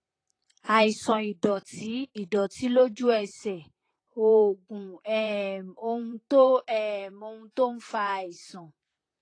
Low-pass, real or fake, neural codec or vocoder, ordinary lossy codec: 9.9 kHz; fake; vocoder, 44.1 kHz, 128 mel bands, Pupu-Vocoder; AAC, 32 kbps